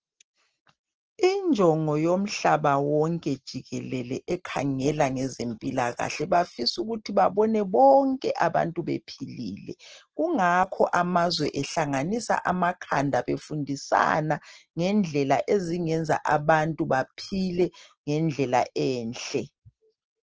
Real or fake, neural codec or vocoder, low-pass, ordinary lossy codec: real; none; 7.2 kHz; Opus, 16 kbps